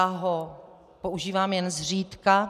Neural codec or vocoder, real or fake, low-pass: none; real; 14.4 kHz